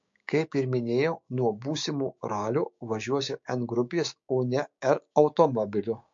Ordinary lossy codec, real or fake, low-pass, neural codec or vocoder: MP3, 48 kbps; fake; 7.2 kHz; codec, 16 kHz, 6 kbps, DAC